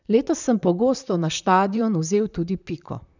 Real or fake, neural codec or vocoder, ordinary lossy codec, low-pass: fake; vocoder, 44.1 kHz, 128 mel bands, Pupu-Vocoder; none; 7.2 kHz